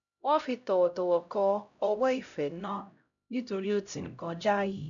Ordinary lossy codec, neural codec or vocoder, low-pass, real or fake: none; codec, 16 kHz, 0.5 kbps, X-Codec, HuBERT features, trained on LibriSpeech; 7.2 kHz; fake